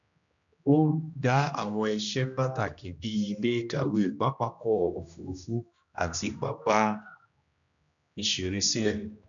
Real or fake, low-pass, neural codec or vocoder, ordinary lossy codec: fake; 7.2 kHz; codec, 16 kHz, 1 kbps, X-Codec, HuBERT features, trained on general audio; none